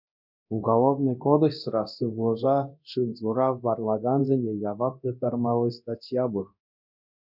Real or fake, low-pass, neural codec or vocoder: fake; 5.4 kHz; codec, 24 kHz, 0.9 kbps, DualCodec